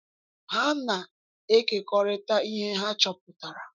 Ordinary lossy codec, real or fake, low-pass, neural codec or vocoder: none; fake; 7.2 kHz; autoencoder, 48 kHz, 128 numbers a frame, DAC-VAE, trained on Japanese speech